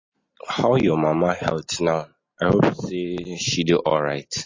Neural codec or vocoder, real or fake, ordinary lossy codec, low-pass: none; real; MP3, 32 kbps; 7.2 kHz